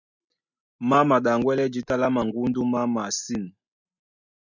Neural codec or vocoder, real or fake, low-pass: none; real; 7.2 kHz